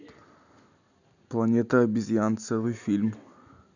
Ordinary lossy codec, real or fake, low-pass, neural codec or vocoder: none; real; 7.2 kHz; none